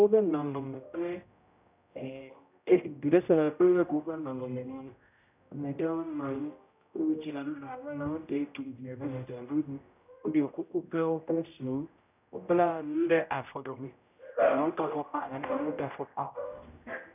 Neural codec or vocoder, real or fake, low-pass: codec, 16 kHz, 0.5 kbps, X-Codec, HuBERT features, trained on general audio; fake; 3.6 kHz